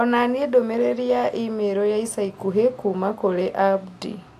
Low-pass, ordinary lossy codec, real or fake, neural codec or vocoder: 14.4 kHz; AAC, 64 kbps; real; none